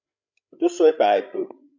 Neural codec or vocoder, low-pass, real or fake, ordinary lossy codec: codec, 16 kHz, 16 kbps, FreqCodec, larger model; 7.2 kHz; fake; MP3, 64 kbps